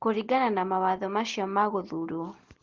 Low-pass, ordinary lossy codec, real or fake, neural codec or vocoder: 7.2 kHz; Opus, 16 kbps; real; none